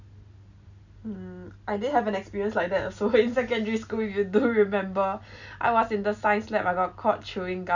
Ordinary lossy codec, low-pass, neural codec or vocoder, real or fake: none; 7.2 kHz; none; real